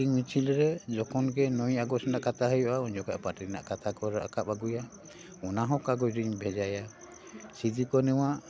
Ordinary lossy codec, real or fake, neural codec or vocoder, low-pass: none; real; none; none